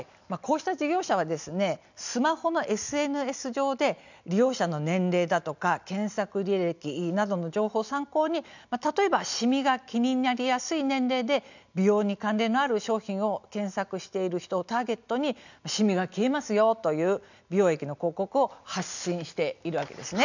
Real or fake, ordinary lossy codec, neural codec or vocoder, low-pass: real; none; none; 7.2 kHz